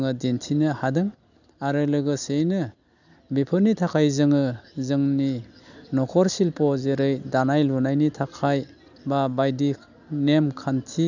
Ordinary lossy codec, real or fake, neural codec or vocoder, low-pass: none; real; none; 7.2 kHz